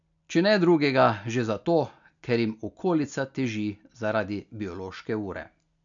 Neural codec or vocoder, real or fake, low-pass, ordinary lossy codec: none; real; 7.2 kHz; none